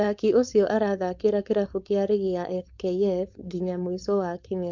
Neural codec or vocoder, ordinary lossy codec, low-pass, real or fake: codec, 16 kHz, 4.8 kbps, FACodec; MP3, 64 kbps; 7.2 kHz; fake